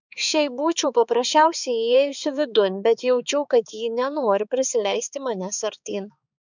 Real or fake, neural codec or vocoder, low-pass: fake; codec, 16 kHz, 4 kbps, X-Codec, HuBERT features, trained on balanced general audio; 7.2 kHz